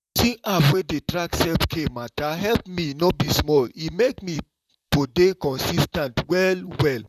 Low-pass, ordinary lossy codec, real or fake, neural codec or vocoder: 14.4 kHz; none; fake; vocoder, 44.1 kHz, 128 mel bands, Pupu-Vocoder